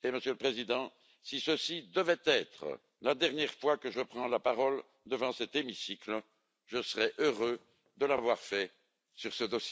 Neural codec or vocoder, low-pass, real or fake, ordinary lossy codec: none; none; real; none